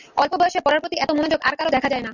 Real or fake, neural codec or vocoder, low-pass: real; none; 7.2 kHz